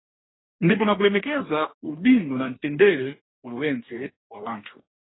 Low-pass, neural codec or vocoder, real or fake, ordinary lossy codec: 7.2 kHz; codec, 44.1 kHz, 2.6 kbps, DAC; fake; AAC, 16 kbps